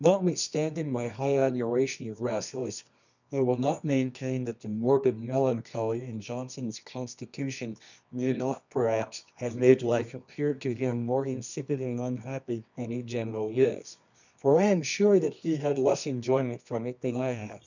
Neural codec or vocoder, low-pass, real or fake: codec, 24 kHz, 0.9 kbps, WavTokenizer, medium music audio release; 7.2 kHz; fake